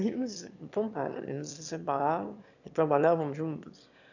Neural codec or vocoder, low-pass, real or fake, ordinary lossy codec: autoencoder, 22.05 kHz, a latent of 192 numbers a frame, VITS, trained on one speaker; 7.2 kHz; fake; none